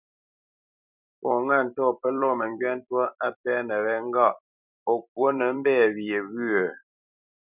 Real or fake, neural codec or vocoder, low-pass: fake; vocoder, 44.1 kHz, 128 mel bands every 256 samples, BigVGAN v2; 3.6 kHz